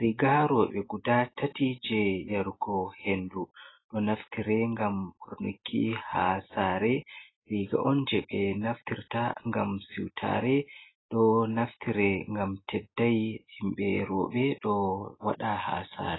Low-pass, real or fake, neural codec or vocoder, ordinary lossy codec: 7.2 kHz; real; none; AAC, 16 kbps